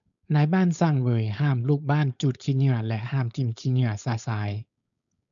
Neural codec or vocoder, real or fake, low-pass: codec, 16 kHz, 4.8 kbps, FACodec; fake; 7.2 kHz